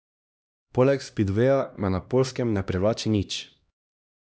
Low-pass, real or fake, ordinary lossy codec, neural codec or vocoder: none; fake; none; codec, 16 kHz, 1 kbps, X-Codec, HuBERT features, trained on LibriSpeech